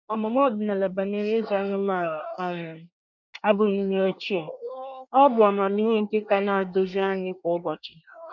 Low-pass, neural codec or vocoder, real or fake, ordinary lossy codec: 7.2 kHz; codec, 24 kHz, 1 kbps, SNAC; fake; none